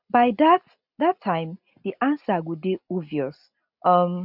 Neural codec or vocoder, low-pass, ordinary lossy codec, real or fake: none; 5.4 kHz; none; real